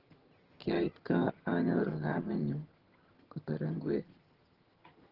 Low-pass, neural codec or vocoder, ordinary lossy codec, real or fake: 5.4 kHz; vocoder, 22.05 kHz, 80 mel bands, HiFi-GAN; Opus, 16 kbps; fake